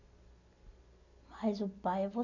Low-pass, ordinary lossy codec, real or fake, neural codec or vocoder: 7.2 kHz; none; real; none